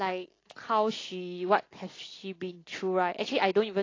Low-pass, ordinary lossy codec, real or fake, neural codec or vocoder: 7.2 kHz; AAC, 32 kbps; real; none